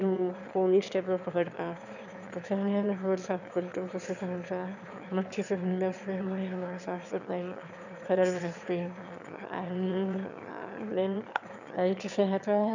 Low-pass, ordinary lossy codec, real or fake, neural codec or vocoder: 7.2 kHz; none; fake; autoencoder, 22.05 kHz, a latent of 192 numbers a frame, VITS, trained on one speaker